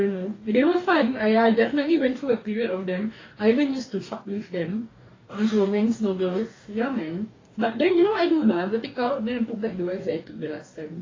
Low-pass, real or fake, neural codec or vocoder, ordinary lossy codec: 7.2 kHz; fake; codec, 44.1 kHz, 2.6 kbps, DAC; AAC, 32 kbps